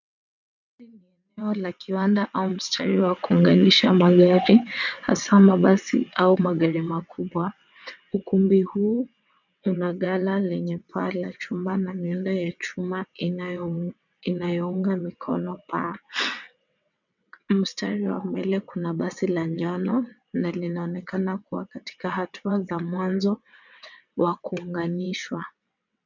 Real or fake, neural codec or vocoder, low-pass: fake; vocoder, 22.05 kHz, 80 mel bands, WaveNeXt; 7.2 kHz